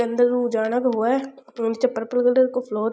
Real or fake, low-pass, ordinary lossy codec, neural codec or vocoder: real; none; none; none